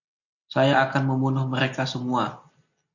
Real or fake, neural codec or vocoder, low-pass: real; none; 7.2 kHz